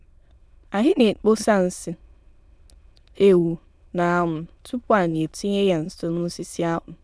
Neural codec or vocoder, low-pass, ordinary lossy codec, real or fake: autoencoder, 22.05 kHz, a latent of 192 numbers a frame, VITS, trained on many speakers; none; none; fake